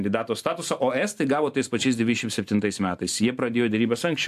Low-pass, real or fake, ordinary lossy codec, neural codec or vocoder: 14.4 kHz; fake; AAC, 96 kbps; vocoder, 44.1 kHz, 128 mel bands every 512 samples, BigVGAN v2